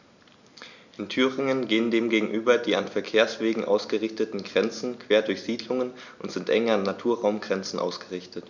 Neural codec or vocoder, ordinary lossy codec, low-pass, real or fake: none; none; 7.2 kHz; real